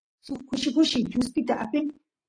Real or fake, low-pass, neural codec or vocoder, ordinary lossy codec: real; 9.9 kHz; none; AAC, 32 kbps